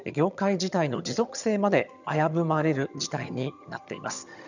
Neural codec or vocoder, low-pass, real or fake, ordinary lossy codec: vocoder, 22.05 kHz, 80 mel bands, HiFi-GAN; 7.2 kHz; fake; none